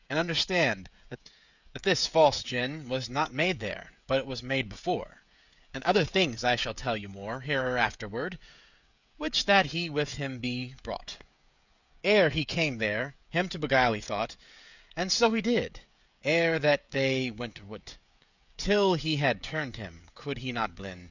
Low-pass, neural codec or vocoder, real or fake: 7.2 kHz; codec, 16 kHz, 16 kbps, FreqCodec, smaller model; fake